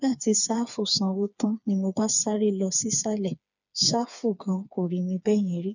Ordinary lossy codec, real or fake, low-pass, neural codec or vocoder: none; fake; 7.2 kHz; codec, 16 kHz, 4 kbps, FreqCodec, smaller model